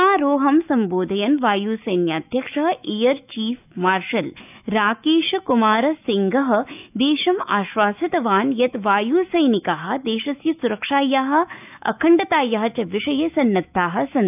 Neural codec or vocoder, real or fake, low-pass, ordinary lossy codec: autoencoder, 48 kHz, 128 numbers a frame, DAC-VAE, trained on Japanese speech; fake; 3.6 kHz; none